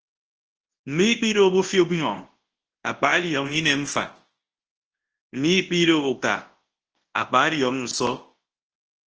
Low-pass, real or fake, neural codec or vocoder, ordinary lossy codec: 7.2 kHz; fake; codec, 24 kHz, 0.9 kbps, WavTokenizer, large speech release; Opus, 16 kbps